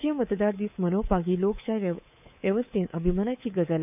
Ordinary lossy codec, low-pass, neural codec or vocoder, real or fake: none; 3.6 kHz; codec, 24 kHz, 3.1 kbps, DualCodec; fake